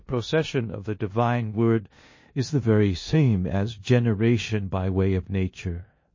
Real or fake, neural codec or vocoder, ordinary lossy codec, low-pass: fake; codec, 16 kHz in and 24 kHz out, 0.9 kbps, LongCat-Audio-Codec, four codebook decoder; MP3, 32 kbps; 7.2 kHz